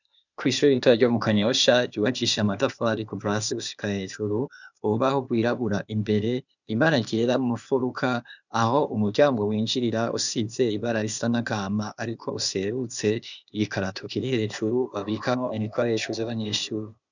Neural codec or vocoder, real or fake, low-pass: codec, 16 kHz, 0.8 kbps, ZipCodec; fake; 7.2 kHz